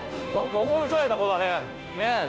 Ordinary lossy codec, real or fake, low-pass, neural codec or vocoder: none; fake; none; codec, 16 kHz, 0.5 kbps, FunCodec, trained on Chinese and English, 25 frames a second